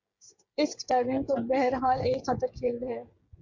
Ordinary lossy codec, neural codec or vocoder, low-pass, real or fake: Opus, 64 kbps; codec, 16 kHz, 16 kbps, FreqCodec, smaller model; 7.2 kHz; fake